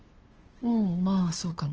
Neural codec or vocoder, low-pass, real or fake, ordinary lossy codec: codec, 16 kHz, 2 kbps, FunCodec, trained on LibriTTS, 25 frames a second; 7.2 kHz; fake; Opus, 16 kbps